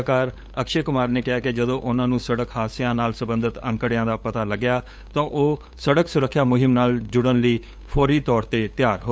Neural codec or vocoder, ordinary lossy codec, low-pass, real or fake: codec, 16 kHz, 8 kbps, FunCodec, trained on LibriTTS, 25 frames a second; none; none; fake